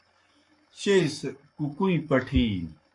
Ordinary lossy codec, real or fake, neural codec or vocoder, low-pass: MP3, 48 kbps; fake; codec, 44.1 kHz, 7.8 kbps, Pupu-Codec; 10.8 kHz